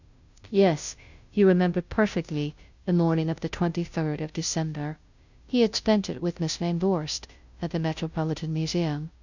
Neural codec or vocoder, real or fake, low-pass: codec, 16 kHz, 0.5 kbps, FunCodec, trained on Chinese and English, 25 frames a second; fake; 7.2 kHz